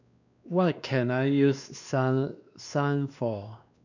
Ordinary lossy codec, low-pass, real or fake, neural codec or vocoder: none; 7.2 kHz; fake; codec, 16 kHz, 2 kbps, X-Codec, WavLM features, trained on Multilingual LibriSpeech